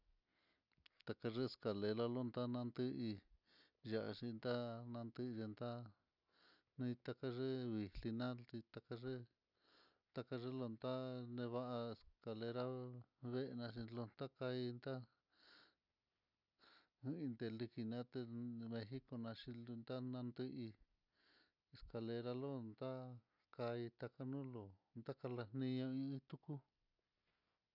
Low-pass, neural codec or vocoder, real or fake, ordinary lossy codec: 5.4 kHz; none; real; none